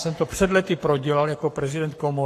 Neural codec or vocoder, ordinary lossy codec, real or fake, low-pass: codec, 44.1 kHz, 7.8 kbps, Pupu-Codec; AAC, 48 kbps; fake; 14.4 kHz